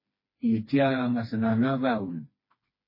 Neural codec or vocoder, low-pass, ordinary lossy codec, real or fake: codec, 16 kHz, 2 kbps, FreqCodec, smaller model; 5.4 kHz; MP3, 24 kbps; fake